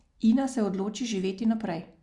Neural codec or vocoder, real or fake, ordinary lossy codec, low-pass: none; real; Opus, 64 kbps; 10.8 kHz